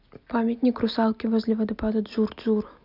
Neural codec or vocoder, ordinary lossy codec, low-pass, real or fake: none; none; 5.4 kHz; real